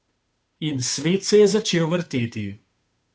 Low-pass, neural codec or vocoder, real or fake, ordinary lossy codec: none; codec, 16 kHz, 2 kbps, FunCodec, trained on Chinese and English, 25 frames a second; fake; none